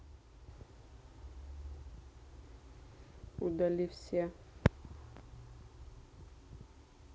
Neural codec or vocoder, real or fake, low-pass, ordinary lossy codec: none; real; none; none